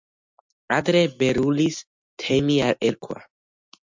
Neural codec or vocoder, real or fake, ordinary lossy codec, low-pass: none; real; MP3, 64 kbps; 7.2 kHz